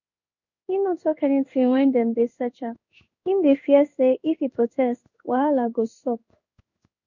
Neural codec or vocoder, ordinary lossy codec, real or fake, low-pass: codec, 16 kHz in and 24 kHz out, 1 kbps, XY-Tokenizer; none; fake; 7.2 kHz